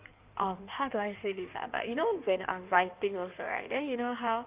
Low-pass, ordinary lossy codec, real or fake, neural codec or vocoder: 3.6 kHz; Opus, 24 kbps; fake; codec, 16 kHz in and 24 kHz out, 1.1 kbps, FireRedTTS-2 codec